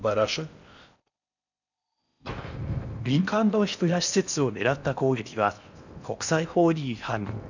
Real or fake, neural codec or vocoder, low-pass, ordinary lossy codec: fake; codec, 16 kHz in and 24 kHz out, 0.6 kbps, FocalCodec, streaming, 4096 codes; 7.2 kHz; none